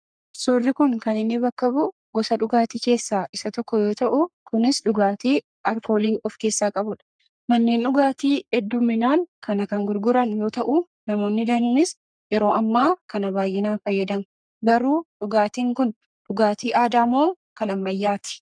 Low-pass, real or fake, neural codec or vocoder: 9.9 kHz; fake; codec, 44.1 kHz, 3.4 kbps, Pupu-Codec